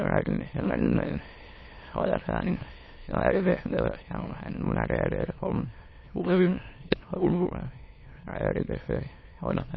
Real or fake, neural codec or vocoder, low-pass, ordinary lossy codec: fake; autoencoder, 22.05 kHz, a latent of 192 numbers a frame, VITS, trained on many speakers; 7.2 kHz; MP3, 24 kbps